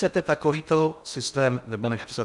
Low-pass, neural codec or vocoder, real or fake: 10.8 kHz; codec, 16 kHz in and 24 kHz out, 0.8 kbps, FocalCodec, streaming, 65536 codes; fake